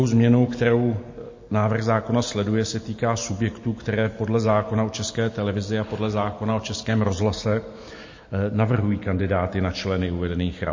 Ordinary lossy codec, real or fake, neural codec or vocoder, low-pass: MP3, 32 kbps; real; none; 7.2 kHz